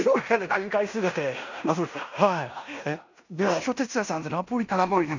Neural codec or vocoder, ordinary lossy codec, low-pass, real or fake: codec, 16 kHz in and 24 kHz out, 0.9 kbps, LongCat-Audio-Codec, fine tuned four codebook decoder; none; 7.2 kHz; fake